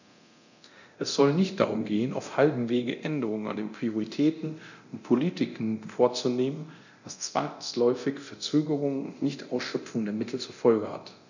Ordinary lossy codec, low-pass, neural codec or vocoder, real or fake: none; 7.2 kHz; codec, 24 kHz, 0.9 kbps, DualCodec; fake